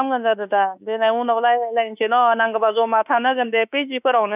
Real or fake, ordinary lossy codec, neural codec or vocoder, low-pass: fake; none; autoencoder, 48 kHz, 32 numbers a frame, DAC-VAE, trained on Japanese speech; 3.6 kHz